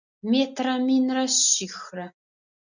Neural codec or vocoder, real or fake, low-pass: none; real; 7.2 kHz